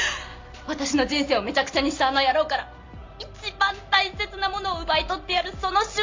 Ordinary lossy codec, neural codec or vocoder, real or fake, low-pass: MP3, 48 kbps; none; real; 7.2 kHz